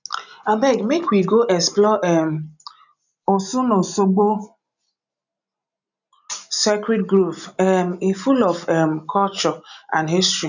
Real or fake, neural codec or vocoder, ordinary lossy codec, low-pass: real; none; none; 7.2 kHz